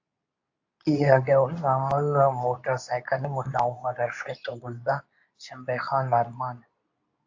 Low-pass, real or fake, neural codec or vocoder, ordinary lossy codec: 7.2 kHz; fake; codec, 24 kHz, 0.9 kbps, WavTokenizer, medium speech release version 2; AAC, 48 kbps